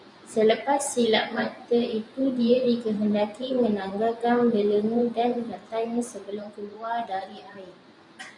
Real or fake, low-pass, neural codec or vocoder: fake; 10.8 kHz; vocoder, 24 kHz, 100 mel bands, Vocos